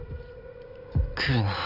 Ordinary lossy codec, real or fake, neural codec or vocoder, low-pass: none; real; none; 5.4 kHz